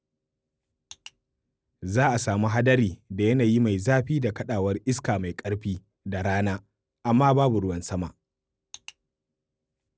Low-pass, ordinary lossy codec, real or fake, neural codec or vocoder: none; none; real; none